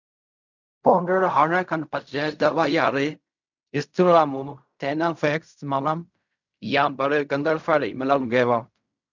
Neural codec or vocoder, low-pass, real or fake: codec, 16 kHz in and 24 kHz out, 0.4 kbps, LongCat-Audio-Codec, fine tuned four codebook decoder; 7.2 kHz; fake